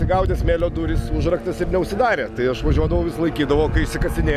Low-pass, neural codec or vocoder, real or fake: 14.4 kHz; none; real